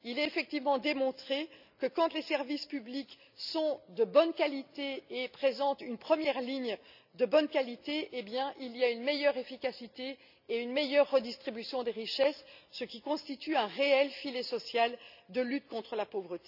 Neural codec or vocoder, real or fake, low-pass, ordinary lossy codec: none; real; 5.4 kHz; none